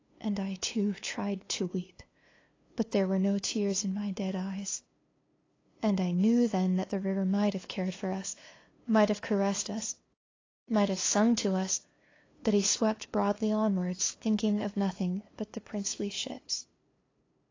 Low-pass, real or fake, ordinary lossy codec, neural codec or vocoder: 7.2 kHz; fake; AAC, 32 kbps; codec, 16 kHz, 2 kbps, FunCodec, trained on LibriTTS, 25 frames a second